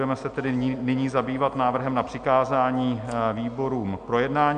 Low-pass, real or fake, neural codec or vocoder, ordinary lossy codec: 9.9 kHz; real; none; MP3, 96 kbps